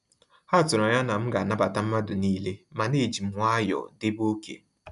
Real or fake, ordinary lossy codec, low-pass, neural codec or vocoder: real; none; 10.8 kHz; none